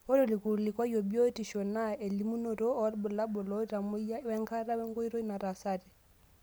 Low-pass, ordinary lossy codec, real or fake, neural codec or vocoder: none; none; real; none